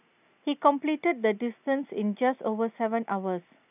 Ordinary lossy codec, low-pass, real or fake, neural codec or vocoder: none; 3.6 kHz; real; none